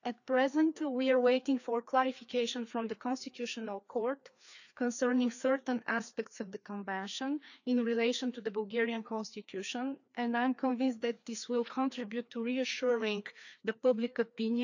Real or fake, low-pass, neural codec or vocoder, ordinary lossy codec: fake; 7.2 kHz; codec, 16 kHz, 2 kbps, FreqCodec, larger model; none